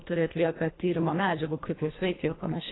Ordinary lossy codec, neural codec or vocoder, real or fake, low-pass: AAC, 16 kbps; codec, 24 kHz, 1.5 kbps, HILCodec; fake; 7.2 kHz